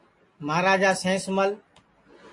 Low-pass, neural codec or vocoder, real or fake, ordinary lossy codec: 10.8 kHz; none; real; AAC, 48 kbps